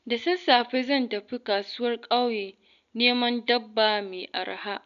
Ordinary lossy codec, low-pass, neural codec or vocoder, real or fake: none; 7.2 kHz; none; real